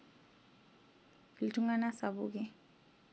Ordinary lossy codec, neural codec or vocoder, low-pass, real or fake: none; none; none; real